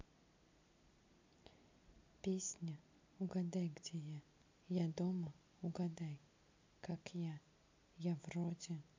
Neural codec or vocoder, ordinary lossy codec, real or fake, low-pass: none; MP3, 64 kbps; real; 7.2 kHz